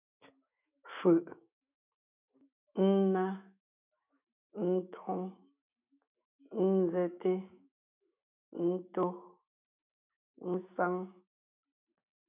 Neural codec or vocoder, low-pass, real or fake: autoencoder, 48 kHz, 128 numbers a frame, DAC-VAE, trained on Japanese speech; 3.6 kHz; fake